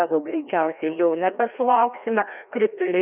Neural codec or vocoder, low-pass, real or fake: codec, 16 kHz, 1 kbps, FreqCodec, larger model; 3.6 kHz; fake